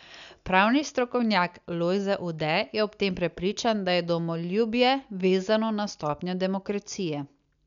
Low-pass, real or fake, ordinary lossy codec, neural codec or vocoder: 7.2 kHz; real; none; none